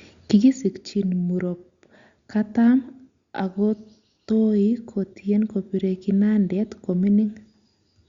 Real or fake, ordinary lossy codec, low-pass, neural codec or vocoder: real; Opus, 64 kbps; 7.2 kHz; none